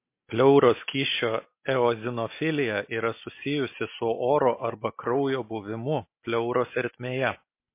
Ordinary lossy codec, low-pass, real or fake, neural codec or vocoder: MP3, 24 kbps; 3.6 kHz; real; none